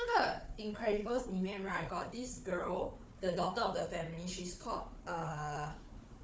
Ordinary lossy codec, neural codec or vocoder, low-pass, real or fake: none; codec, 16 kHz, 4 kbps, FunCodec, trained on Chinese and English, 50 frames a second; none; fake